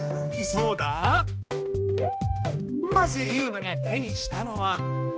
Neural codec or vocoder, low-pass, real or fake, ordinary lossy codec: codec, 16 kHz, 1 kbps, X-Codec, HuBERT features, trained on balanced general audio; none; fake; none